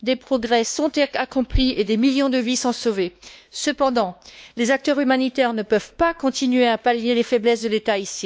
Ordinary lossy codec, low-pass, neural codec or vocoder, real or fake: none; none; codec, 16 kHz, 2 kbps, X-Codec, WavLM features, trained on Multilingual LibriSpeech; fake